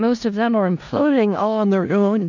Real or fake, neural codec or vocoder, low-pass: fake; codec, 16 kHz in and 24 kHz out, 0.4 kbps, LongCat-Audio-Codec, four codebook decoder; 7.2 kHz